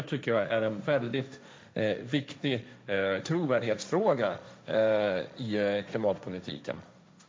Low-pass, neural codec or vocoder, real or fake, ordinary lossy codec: none; codec, 16 kHz, 1.1 kbps, Voila-Tokenizer; fake; none